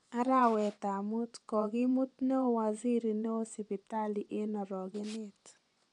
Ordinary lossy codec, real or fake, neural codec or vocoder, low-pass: none; fake; vocoder, 24 kHz, 100 mel bands, Vocos; 10.8 kHz